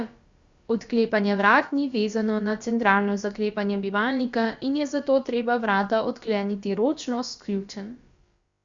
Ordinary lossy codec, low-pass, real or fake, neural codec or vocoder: Opus, 64 kbps; 7.2 kHz; fake; codec, 16 kHz, about 1 kbps, DyCAST, with the encoder's durations